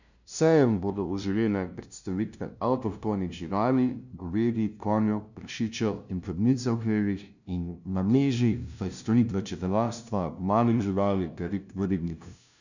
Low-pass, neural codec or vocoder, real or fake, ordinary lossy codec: 7.2 kHz; codec, 16 kHz, 0.5 kbps, FunCodec, trained on LibriTTS, 25 frames a second; fake; none